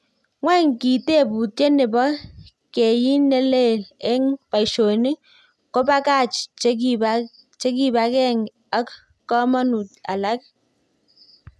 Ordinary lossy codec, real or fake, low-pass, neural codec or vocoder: none; real; none; none